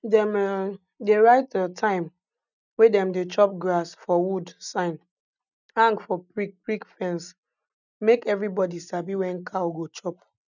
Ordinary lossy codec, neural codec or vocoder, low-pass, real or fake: none; none; 7.2 kHz; real